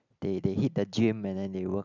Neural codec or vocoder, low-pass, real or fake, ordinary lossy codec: none; 7.2 kHz; real; none